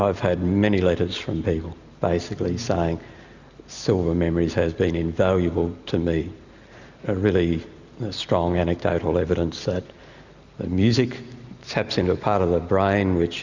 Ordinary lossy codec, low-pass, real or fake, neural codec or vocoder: Opus, 64 kbps; 7.2 kHz; real; none